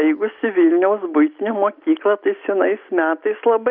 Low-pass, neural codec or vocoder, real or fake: 5.4 kHz; none; real